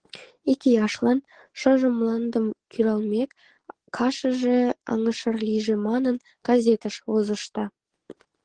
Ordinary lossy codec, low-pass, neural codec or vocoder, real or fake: Opus, 16 kbps; 9.9 kHz; codec, 44.1 kHz, 7.8 kbps, DAC; fake